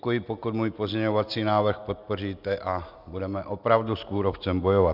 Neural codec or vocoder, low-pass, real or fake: none; 5.4 kHz; real